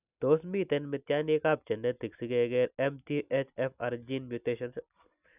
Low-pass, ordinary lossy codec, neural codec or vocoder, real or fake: 3.6 kHz; none; none; real